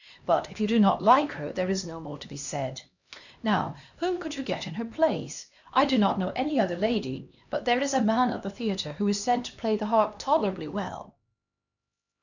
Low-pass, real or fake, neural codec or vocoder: 7.2 kHz; fake; codec, 16 kHz, 2 kbps, X-Codec, HuBERT features, trained on LibriSpeech